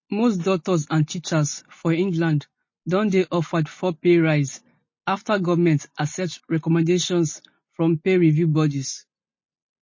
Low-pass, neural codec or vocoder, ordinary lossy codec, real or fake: 7.2 kHz; none; MP3, 32 kbps; real